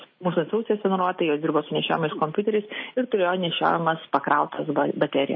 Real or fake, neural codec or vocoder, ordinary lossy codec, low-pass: real; none; MP3, 24 kbps; 7.2 kHz